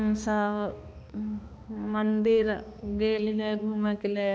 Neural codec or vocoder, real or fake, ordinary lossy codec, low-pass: codec, 16 kHz, 2 kbps, X-Codec, HuBERT features, trained on balanced general audio; fake; none; none